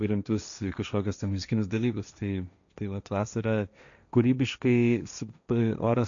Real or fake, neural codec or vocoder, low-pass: fake; codec, 16 kHz, 1.1 kbps, Voila-Tokenizer; 7.2 kHz